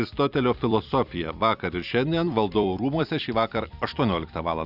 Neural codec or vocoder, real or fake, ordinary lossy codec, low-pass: vocoder, 44.1 kHz, 128 mel bands every 256 samples, BigVGAN v2; fake; Opus, 64 kbps; 5.4 kHz